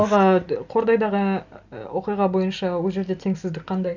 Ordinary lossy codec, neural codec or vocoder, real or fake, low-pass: none; none; real; 7.2 kHz